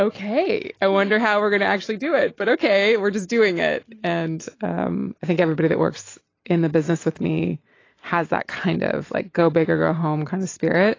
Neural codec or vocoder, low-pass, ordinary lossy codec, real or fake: none; 7.2 kHz; AAC, 32 kbps; real